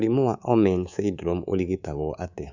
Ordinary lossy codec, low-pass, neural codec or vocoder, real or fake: none; 7.2 kHz; codec, 24 kHz, 3.1 kbps, DualCodec; fake